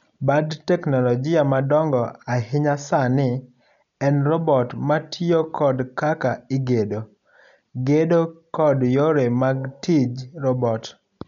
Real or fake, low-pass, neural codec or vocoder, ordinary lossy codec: real; 7.2 kHz; none; none